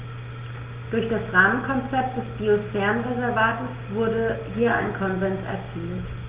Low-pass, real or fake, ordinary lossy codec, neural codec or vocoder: 3.6 kHz; real; Opus, 32 kbps; none